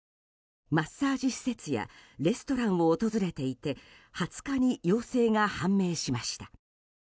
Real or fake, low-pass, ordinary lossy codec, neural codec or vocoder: real; none; none; none